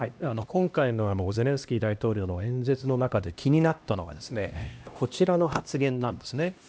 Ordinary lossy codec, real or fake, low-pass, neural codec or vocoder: none; fake; none; codec, 16 kHz, 1 kbps, X-Codec, HuBERT features, trained on LibriSpeech